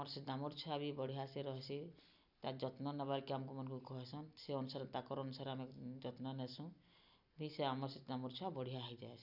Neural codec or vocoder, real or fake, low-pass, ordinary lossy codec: none; real; 5.4 kHz; none